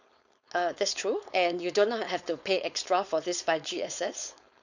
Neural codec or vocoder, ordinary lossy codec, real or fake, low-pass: codec, 16 kHz, 4.8 kbps, FACodec; none; fake; 7.2 kHz